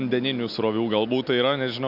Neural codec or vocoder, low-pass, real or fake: none; 5.4 kHz; real